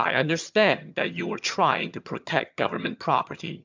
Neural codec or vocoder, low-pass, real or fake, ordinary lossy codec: vocoder, 22.05 kHz, 80 mel bands, HiFi-GAN; 7.2 kHz; fake; MP3, 64 kbps